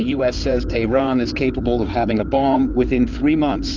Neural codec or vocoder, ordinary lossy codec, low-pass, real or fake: codec, 16 kHz in and 24 kHz out, 2.2 kbps, FireRedTTS-2 codec; Opus, 24 kbps; 7.2 kHz; fake